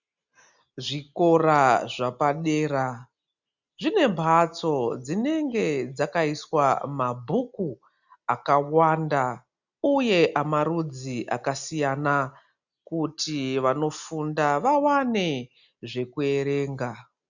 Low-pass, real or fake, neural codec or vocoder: 7.2 kHz; real; none